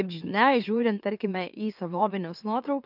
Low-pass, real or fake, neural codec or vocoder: 5.4 kHz; fake; autoencoder, 44.1 kHz, a latent of 192 numbers a frame, MeloTTS